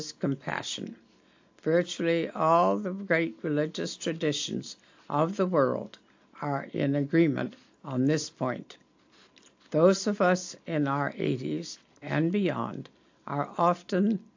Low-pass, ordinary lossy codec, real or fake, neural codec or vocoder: 7.2 kHz; AAC, 48 kbps; real; none